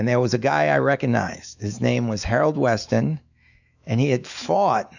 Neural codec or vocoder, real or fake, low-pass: autoencoder, 48 kHz, 128 numbers a frame, DAC-VAE, trained on Japanese speech; fake; 7.2 kHz